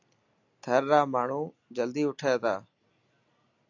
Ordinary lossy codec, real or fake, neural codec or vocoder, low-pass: AAC, 48 kbps; real; none; 7.2 kHz